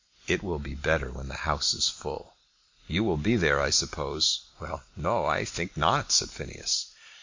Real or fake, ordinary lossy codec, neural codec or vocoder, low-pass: real; MP3, 48 kbps; none; 7.2 kHz